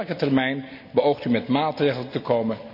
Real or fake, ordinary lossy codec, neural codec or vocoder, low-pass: real; none; none; 5.4 kHz